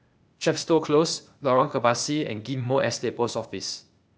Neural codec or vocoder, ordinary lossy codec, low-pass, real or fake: codec, 16 kHz, 0.8 kbps, ZipCodec; none; none; fake